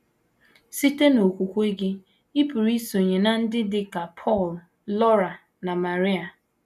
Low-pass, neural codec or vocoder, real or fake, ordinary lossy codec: 14.4 kHz; none; real; none